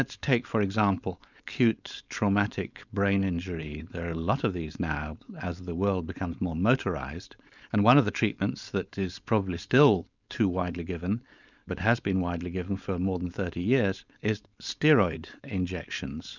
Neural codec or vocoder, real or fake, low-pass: codec, 16 kHz, 4.8 kbps, FACodec; fake; 7.2 kHz